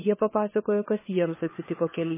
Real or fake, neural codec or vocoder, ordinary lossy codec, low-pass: fake; codec, 16 kHz, 4 kbps, FunCodec, trained on LibriTTS, 50 frames a second; MP3, 16 kbps; 3.6 kHz